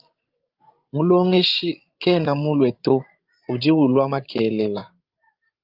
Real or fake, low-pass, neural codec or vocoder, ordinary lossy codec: fake; 5.4 kHz; codec, 16 kHz, 16 kbps, FreqCodec, larger model; Opus, 32 kbps